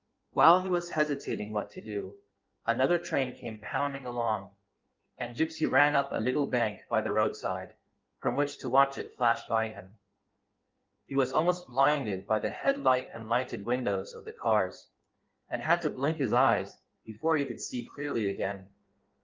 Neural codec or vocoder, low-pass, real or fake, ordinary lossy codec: codec, 16 kHz in and 24 kHz out, 1.1 kbps, FireRedTTS-2 codec; 7.2 kHz; fake; Opus, 32 kbps